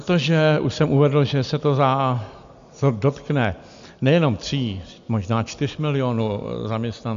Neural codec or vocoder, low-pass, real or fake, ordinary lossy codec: none; 7.2 kHz; real; MP3, 64 kbps